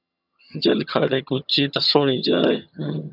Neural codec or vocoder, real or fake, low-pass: vocoder, 22.05 kHz, 80 mel bands, HiFi-GAN; fake; 5.4 kHz